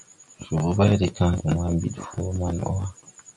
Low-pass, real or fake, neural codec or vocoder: 10.8 kHz; real; none